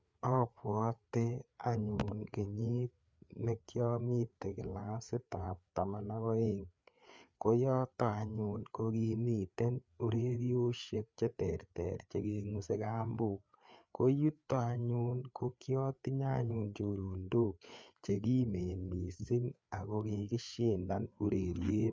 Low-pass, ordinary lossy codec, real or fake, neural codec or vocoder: none; none; fake; codec, 16 kHz, 4 kbps, FreqCodec, larger model